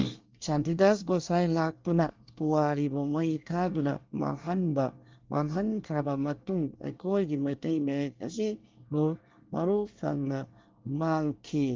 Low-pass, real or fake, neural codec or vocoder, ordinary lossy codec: 7.2 kHz; fake; codec, 24 kHz, 1 kbps, SNAC; Opus, 32 kbps